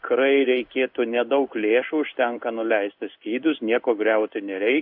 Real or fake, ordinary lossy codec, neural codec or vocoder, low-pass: fake; AAC, 48 kbps; codec, 16 kHz in and 24 kHz out, 1 kbps, XY-Tokenizer; 5.4 kHz